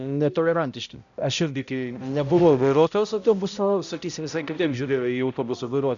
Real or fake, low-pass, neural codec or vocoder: fake; 7.2 kHz; codec, 16 kHz, 0.5 kbps, X-Codec, HuBERT features, trained on balanced general audio